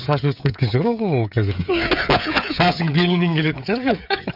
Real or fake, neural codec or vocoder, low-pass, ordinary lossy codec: fake; codec, 16 kHz, 16 kbps, FreqCodec, smaller model; 5.4 kHz; none